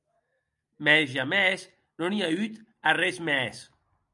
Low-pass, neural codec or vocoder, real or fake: 10.8 kHz; none; real